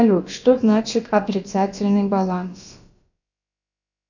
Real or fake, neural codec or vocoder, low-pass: fake; codec, 16 kHz, about 1 kbps, DyCAST, with the encoder's durations; 7.2 kHz